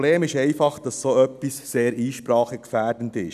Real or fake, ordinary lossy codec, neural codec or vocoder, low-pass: real; none; none; 14.4 kHz